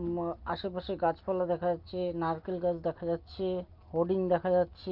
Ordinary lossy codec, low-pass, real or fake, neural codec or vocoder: Opus, 24 kbps; 5.4 kHz; real; none